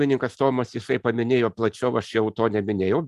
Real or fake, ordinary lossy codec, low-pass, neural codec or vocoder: real; Opus, 64 kbps; 14.4 kHz; none